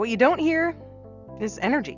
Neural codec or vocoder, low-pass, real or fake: none; 7.2 kHz; real